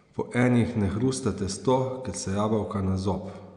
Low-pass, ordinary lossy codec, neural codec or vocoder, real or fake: 9.9 kHz; none; none; real